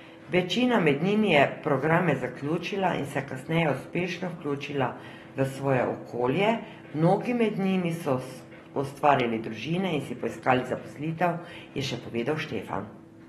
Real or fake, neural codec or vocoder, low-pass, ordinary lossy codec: real; none; 14.4 kHz; AAC, 32 kbps